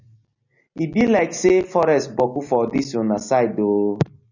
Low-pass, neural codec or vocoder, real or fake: 7.2 kHz; none; real